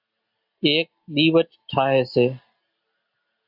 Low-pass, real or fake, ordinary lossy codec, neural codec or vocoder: 5.4 kHz; real; AAC, 48 kbps; none